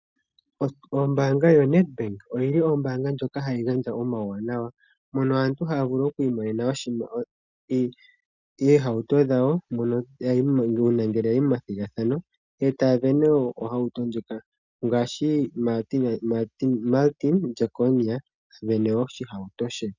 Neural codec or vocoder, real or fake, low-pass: none; real; 7.2 kHz